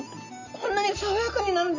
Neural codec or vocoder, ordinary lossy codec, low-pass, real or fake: none; none; 7.2 kHz; real